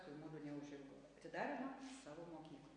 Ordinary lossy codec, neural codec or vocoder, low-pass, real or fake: MP3, 48 kbps; none; 9.9 kHz; real